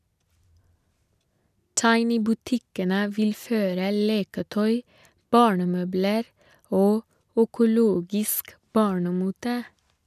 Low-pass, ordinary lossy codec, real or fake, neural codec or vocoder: 14.4 kHz; none; real; none